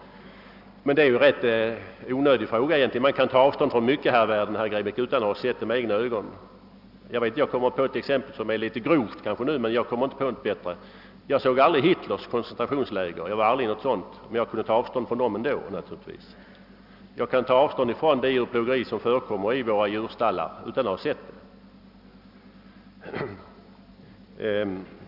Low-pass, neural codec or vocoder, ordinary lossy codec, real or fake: 5.4 kHz; none; none; real